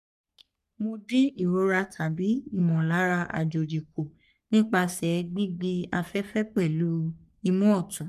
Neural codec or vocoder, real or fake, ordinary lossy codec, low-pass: codec, 44.1 kHz, 3.4 kbps, Pupu-Codec; fake; none; 14.4 kHz